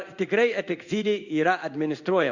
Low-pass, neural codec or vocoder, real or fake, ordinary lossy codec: 7.2 kHz; codec, 16 kHz in and 24 kHz out, 1 kbps, XY-Tokenizer; fake; Opus, 64 kbps